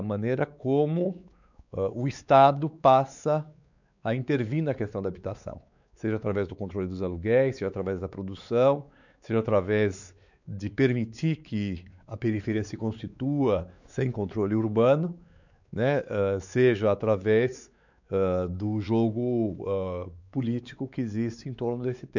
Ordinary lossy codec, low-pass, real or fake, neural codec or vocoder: none; 7.2 kHz; fake; codec, 16 kHz, 4 kbps, X-Codec, WavLM features, trained on Multilingual LibriSpeech